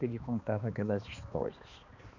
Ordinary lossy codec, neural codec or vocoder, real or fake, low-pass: none; codec, 16 kHz, 2 kbps, X-Codec, HuBERT features, trained on balanced general audio; fake; 7.2 kHz